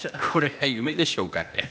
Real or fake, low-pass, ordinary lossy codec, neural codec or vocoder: fake; none; none; codec, 16 kHz, 0.8 kbps, ZipCodec